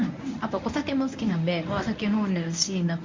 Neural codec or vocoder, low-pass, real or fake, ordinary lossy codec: codec, 24 kHz, 0.9 kbps, WavTokenizer, medium speech release version 1; 7.2 kHz; fake; MP3, 48 kbps